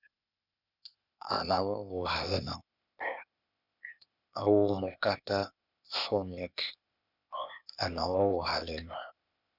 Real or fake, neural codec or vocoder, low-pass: fake; codec, 16 kHz, 0.8 kbps, ZipCodec; 5.4 kHz